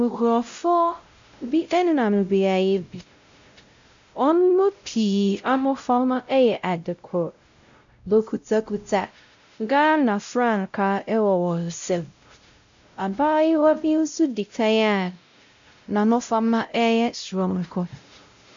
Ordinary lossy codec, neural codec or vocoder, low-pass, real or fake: MP3, 64 kbps; codec, 16 kHz, 0.5 kbps, X-Codec, WavLM features, trained on Multilingual LibriSpeech; 7.2 kHz; fake